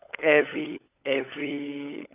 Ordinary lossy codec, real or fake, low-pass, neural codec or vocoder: none; fake; 3.6 kHz; codec, 16 kHz, 16 kbps, FunCodec, trained on LibriTTS, 50 frames a second